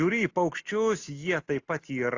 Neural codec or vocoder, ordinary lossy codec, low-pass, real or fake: none; AAC, 48 kbps; 7.2 kHz; real